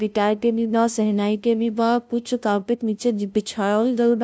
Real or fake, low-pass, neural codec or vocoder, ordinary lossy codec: fake; none; codec, 16 kHz, 0.5 kbps, FunCodec, trained on LibriTTS, 25 frames a second; none